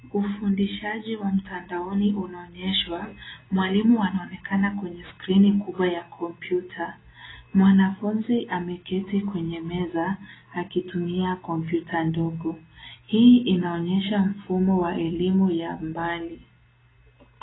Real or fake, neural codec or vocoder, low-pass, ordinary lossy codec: real; none; 7.2 kHz; AAC, 16 kbps